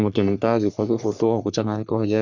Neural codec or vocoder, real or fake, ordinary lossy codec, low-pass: codec, 44.1 kHz, 3.4 kbps, Pupu-Codec; fake; none; 7.2 kHz